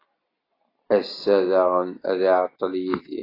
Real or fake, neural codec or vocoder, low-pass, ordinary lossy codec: real; none; 5.4 kHz; AAC, 24 kbps